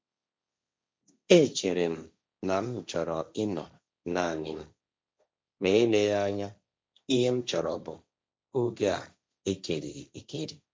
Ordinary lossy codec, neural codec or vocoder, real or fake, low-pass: none; codec, 16 kHz, 1.1 kbps, Voila-Tokenizer; fake; none